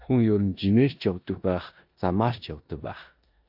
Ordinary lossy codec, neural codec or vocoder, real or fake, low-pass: AAC, 48 kbps; codec, 16 kHz in and 24 kHz out, 0.9 kbps, LongCat-Audio-Codec, four codebook decoder; fake; 5.4 kHz